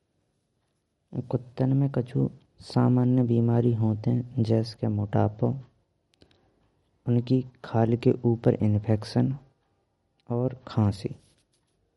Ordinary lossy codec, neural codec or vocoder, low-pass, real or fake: MP3, 48 kbps; none; 19.8 kHz; real